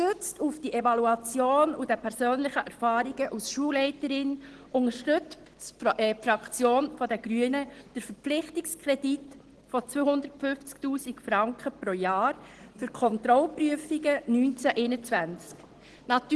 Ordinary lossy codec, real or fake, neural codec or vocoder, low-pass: Opus, 16 kbps; real; none; 10.8 kHz